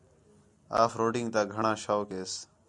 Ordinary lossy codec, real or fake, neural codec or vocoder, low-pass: MP3, 96 kbps; real; none; 10.8 kHz